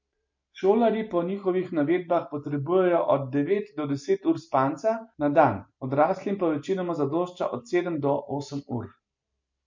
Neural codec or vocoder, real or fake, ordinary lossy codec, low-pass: none; real; MP3, 48 kbps; 7.2 kHz